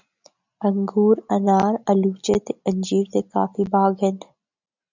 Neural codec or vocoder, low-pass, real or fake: none; 7.2 kHz; real